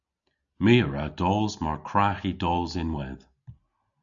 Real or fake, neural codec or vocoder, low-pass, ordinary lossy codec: real; none; 7.2 kHz; MP3, 96 kbps